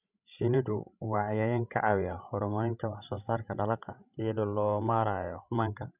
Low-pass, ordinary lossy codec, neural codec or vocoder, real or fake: 3.6 kHz; none; codec, 16 kHz, 16 kbps, FreqCodec, larger model; fake